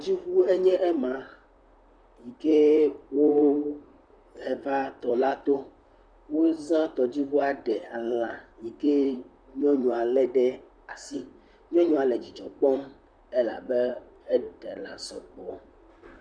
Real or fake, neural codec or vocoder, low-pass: fake; vocoder, 44.1 kHz, 128 mel bands, Pupu-Vocoder; 9.9 kHz